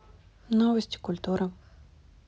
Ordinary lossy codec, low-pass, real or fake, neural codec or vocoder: none; none; real; none